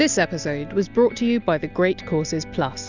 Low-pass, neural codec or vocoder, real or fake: 7.2 kHz; none; real